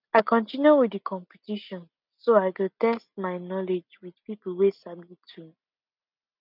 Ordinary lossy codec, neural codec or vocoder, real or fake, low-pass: none; none; real; 5.4 kHz